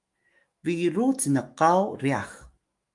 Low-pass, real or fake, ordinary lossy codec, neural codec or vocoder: 10.8 kHz; fake; Opus, 32 kbps; autoencoder, 48 kHz, 128 numbers a frame, DAC-VAE, trained on Japanese speech